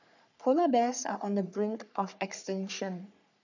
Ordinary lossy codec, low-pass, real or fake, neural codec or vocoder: none; 7.2 kHz; fake; codec, 44.1 kHz, 3.4 kbps, Pupu-Codec